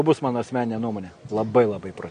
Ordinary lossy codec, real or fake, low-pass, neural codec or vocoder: MP3, 48 kbps; real; 9.9 kHz; none